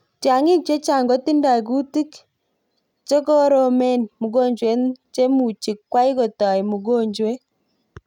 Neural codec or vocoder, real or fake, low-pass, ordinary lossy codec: none; real; 19.8 kHz; none